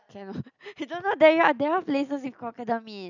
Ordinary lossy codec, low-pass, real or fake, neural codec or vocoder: none; 7.2 kHz; real; none